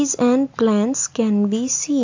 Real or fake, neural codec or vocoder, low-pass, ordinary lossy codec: real; none; 7.2 kHz; AAC, 48 kbps